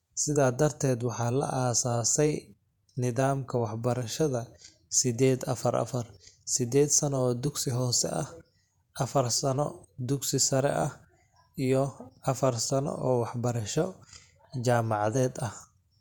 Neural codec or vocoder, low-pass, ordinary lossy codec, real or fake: vocoder, 48 kHz, 128 mel bands, Vocos; 19.8 kHz; none; fake